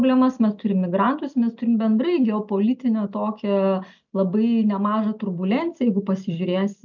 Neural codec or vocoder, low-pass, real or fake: none; 7.2 kHz; real